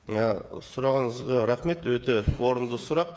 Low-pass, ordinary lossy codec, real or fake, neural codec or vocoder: none; none; fake; codec, 16 kHz, 8 kbps, FreqCodec, smaller model